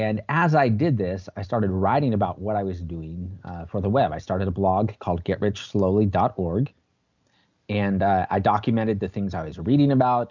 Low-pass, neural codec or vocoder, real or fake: 7.2 kHz; none; real